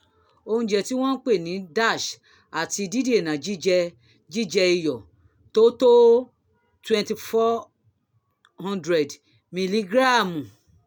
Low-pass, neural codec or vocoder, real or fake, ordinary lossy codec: none; none; real; none